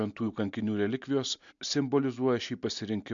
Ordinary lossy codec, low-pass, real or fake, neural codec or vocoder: MP3, 64 kbps; 7.2 kHz; real; none